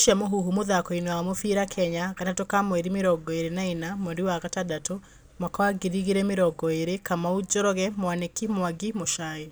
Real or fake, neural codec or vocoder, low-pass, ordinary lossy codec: real; none; none; none